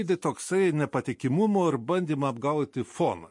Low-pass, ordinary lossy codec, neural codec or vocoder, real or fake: 10.8 kHz; MP3, 48 kbps; none; real